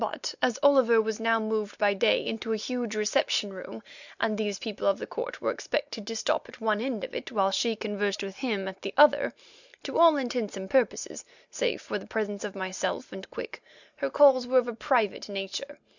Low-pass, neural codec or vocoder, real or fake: 7.2 kHz; none; real